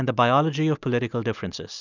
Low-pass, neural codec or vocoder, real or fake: 7.2 kHz; none; real